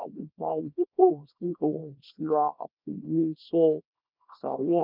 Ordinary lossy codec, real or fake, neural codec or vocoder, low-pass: none; fake; codec, 24 kHz, 0.9 kbps, WavTokenizer, small release; 5.4 kHz